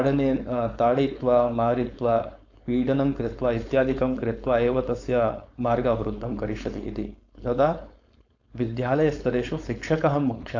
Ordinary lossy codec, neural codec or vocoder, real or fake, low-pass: AAC, 48 kbps; codec, 16 kHz, 4.8 kbps, FACodec; fake; 7.2 kHz